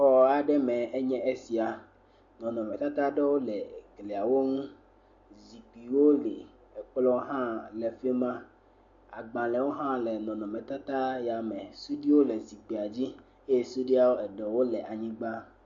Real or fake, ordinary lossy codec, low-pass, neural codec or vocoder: real; MP3, 48 kbps; 7.2 kHz; none